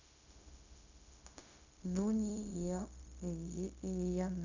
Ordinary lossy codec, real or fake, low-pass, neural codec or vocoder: none; fake; 7.2 kHz; codec, 16 kHz, 0.4 kbps, LongCat-Audio-Codec